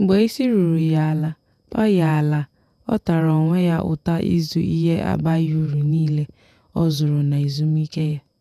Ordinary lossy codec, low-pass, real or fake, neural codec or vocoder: none; 14.4 kHz; fake; vocoder, 48 kHz, 128 mel bands, Vocos